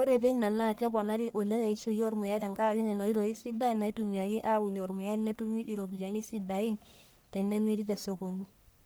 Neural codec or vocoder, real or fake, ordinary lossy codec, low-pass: codec, 44.1 kHz, 1.7 kbps, Pupu-Codec; fake; none; none